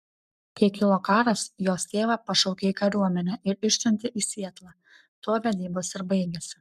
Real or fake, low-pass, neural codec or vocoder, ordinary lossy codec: fake; 14.4 kHz; codec, 44.1 kHz, 7.8 kbps, Pupu-Codec; MP3, 96 kbps